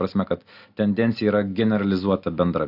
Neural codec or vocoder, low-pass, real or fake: none; 5.4 kHz; real